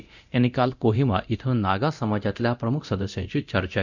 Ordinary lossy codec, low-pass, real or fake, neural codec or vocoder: none; 7.2 kHz; fake; codec, 24 kHz, 0.9 kbps, DualCodec